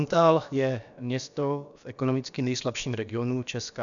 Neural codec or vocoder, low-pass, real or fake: codec, 16 kHz, about 1 kbps, DyCAST, with the encoder's durations; 7.2 kHz; fake